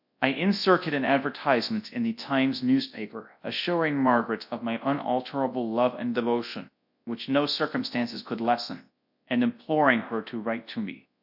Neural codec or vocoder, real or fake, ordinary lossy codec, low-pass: codec, 24 kHz, 0.9 kbps, WavTokenizer, large speech release; fake; AAC, 48 kbps; 5.4 kHz